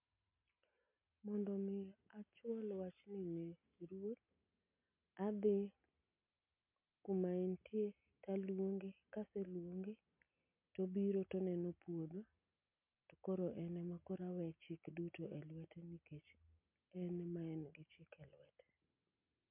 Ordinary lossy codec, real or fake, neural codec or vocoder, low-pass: MP3, 32 kbps; real; none; 3.6 kHz